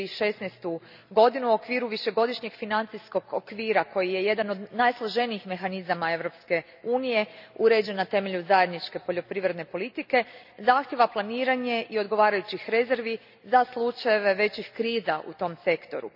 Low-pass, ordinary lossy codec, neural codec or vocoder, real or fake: 5.4 kHz; none; none; real